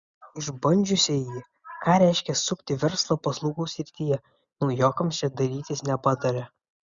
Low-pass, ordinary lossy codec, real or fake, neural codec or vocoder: 7.2 kHz; Opus, 64 kbps; real; none